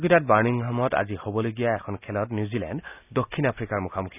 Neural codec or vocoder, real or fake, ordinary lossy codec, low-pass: none; real; none; 3.6 kHz